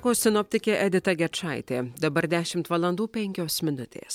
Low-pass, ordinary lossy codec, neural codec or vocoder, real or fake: 19.8 kHz; MP3, 96 kbps; none; real